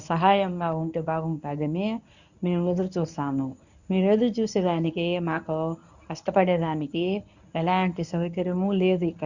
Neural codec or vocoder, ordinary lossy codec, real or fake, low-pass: codec, 24 kHz, 0.9 kbps, WavTokenizer, medium speech release version 1; none; fake; 7.2 kHz